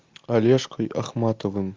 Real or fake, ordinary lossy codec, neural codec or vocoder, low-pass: real; Opus, 32 kbps; none; 7.2 kHz